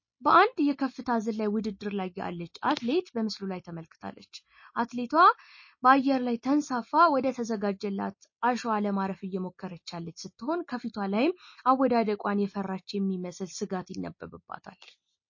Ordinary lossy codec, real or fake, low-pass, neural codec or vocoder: MP3, 32 kbps; real; 7.2 kHz; none